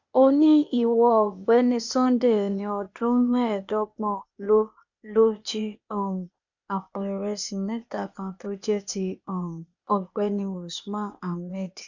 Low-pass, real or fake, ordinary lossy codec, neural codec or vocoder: 7.2 kHz; fake; none; codec, 16 kHz, 0.8 kbps, ZipCodec